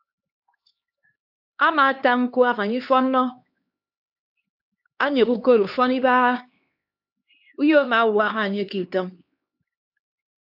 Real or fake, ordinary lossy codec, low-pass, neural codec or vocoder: fake; AAC, 48 kbps; 5.4 kHz; codec, 16 kHz, 2 kbps, X-Codec, HuBERT features, trained on LibriSpeech